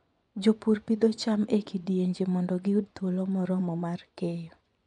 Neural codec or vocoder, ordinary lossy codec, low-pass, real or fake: vocoder, 24 kHz, 100 mel bands, Vocos; none; 10.8 kHz; fake